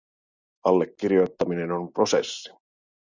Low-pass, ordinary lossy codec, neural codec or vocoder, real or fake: 7.2 kHz; Opus, 64 kbps; none; real